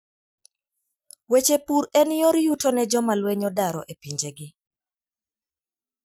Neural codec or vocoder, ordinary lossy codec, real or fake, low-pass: none; none; real; none